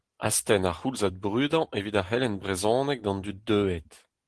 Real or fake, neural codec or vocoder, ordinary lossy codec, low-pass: real; none; Opus, 16 kbps; 10.8 kHz